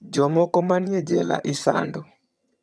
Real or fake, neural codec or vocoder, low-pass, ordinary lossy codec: fake; vocoder, 22.05 kHz, 80 mel bands, HiFi-GAN; none; none